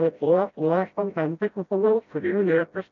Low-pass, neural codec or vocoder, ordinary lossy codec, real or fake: 7.2 kHz; codec, 16 kHz, 0.5 kbps, FreqCodec, smaller model; MP3, 96 kbps; fake